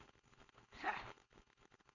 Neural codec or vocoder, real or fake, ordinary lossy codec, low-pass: codec, 16 kHz, 4.8 kbps, FACodec; fake; none; 7.2 kHz